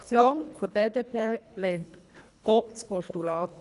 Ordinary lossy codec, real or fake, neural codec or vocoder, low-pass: none; fake; codec, 24 kHz, 1.5 kbps, HILCodec; 10.8 kHz